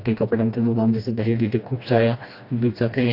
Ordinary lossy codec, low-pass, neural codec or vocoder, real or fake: AAC, 32 kbps; 5.4 kHz; codec, 16 kHz, 1 kbps, FreqCodec, smaller model; fake